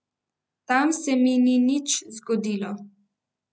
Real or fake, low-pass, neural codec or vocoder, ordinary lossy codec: real; none; none; none